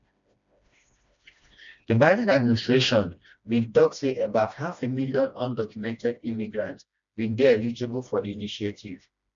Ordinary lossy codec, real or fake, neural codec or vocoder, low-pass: MP3, 64 kbps; fake; codec, 16 kHz, 1 kbps, FreqCodec, smaller model; 7.2 kHz